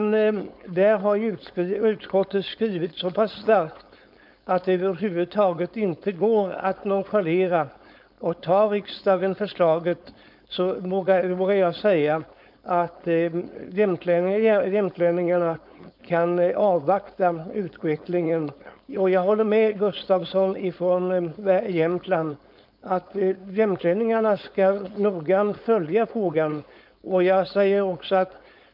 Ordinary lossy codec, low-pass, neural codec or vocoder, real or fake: none; 5.4 kHz; codec, 16 kHz, 4.8 kbps, FACodec; fake